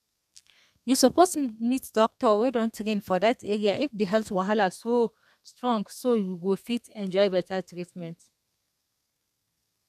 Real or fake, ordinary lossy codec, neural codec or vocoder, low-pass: fake; none; codec, 32 kHz, 1.9 kbps, SNAC; 14.4 kHz